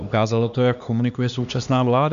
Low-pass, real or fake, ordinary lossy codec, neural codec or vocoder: 7.2 kHz; fake; MP3, 96 kbps; codec, 16 kHz, 2 kbps, X-Codec, HuBERT features, trained on LibriSpeech